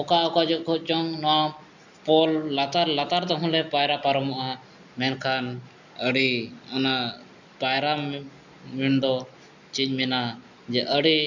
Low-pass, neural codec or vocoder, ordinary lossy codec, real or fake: 7.2 kHz; none; none; real